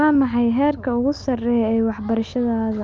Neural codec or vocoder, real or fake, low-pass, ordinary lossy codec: none; real; 7.2 kHz; Opus, 32 kbps